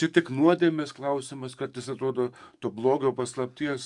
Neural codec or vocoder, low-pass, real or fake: codec, 44.1 kHz, 7.8 kbps, DAC; 10.8 kHz; fake